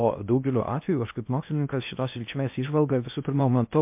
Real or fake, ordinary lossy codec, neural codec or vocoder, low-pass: fake; MP3, 32 kbps; codec, 16 kHz in and 24 kHz out, 0.6 kbps, FocalCodec, streaming, 2048 codes; 3.6 kHz